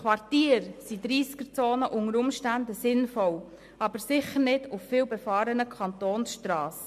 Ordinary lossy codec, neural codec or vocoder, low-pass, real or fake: MP3, 64 kbps; none; 14.4 kHz; real